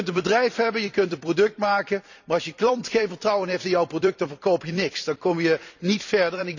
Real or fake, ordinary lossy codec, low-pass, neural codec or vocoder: fake; none; 7.2 kHz; vocoder, 44.1 kHz, 128 mel bands every 256 samples, BigVGAN v2